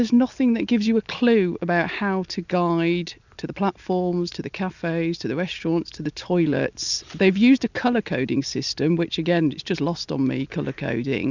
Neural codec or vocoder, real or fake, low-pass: none; real; 7.2 kHz